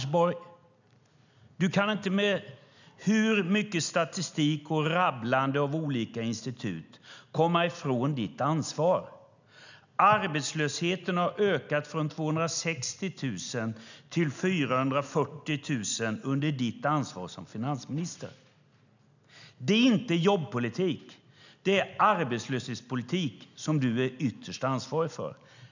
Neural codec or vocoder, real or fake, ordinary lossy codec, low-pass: none; real; none; 7.2 kHz